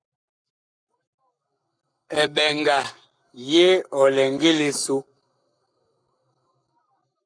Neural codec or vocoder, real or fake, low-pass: codec, 44.1 kHz, 7.8 kbps, Pupu-Codec; fake; 9.9 kHz